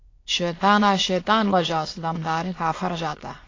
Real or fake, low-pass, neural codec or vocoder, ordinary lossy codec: fake; 7.2 kHz; autoencoder, 22.05 kHz, a latent of 192 numbers a frame, VITS, trained on many speakers; AAC, 32 kbps